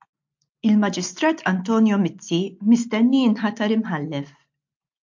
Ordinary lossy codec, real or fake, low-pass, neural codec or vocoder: MP3, 48 kbps; real; 7.2 kHz; none